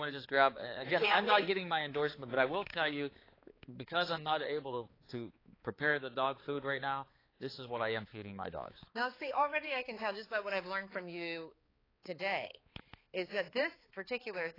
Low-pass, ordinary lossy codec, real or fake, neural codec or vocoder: 5.4 kHz; AAC, 24 kbps; fake; codec, 16 kHz, 4 kbps, X-Codec, HuBERT features, trained on balanced general audio